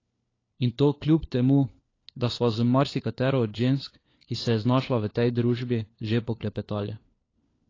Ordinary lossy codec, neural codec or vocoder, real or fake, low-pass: AAC, 32 kbps; codec, 16 kHz, 4 kbps, FunCodec, trained on LibriTTS, 50 frames a second; fake; 7.2 kHz